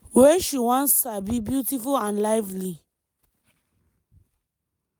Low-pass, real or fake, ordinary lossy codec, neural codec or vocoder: none; real; none; none